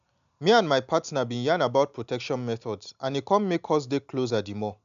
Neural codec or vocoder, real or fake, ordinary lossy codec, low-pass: none; real; none; 7.2 kHz